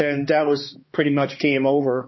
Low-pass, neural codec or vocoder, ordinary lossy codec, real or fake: 7.2 kHz; codec, 16 kHz, 2 kbps, X-Codec, HuBERT features, trained on balanced general audio; MP3, 24 kbps; fake